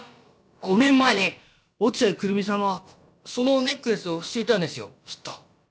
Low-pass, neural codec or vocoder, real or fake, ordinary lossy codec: none; codec, 16 kHz, about 1 kbps, DyCAST, with the encoder's durations; fake; none